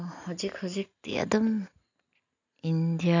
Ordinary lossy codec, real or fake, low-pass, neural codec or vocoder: none; real; 7.2 kHz; none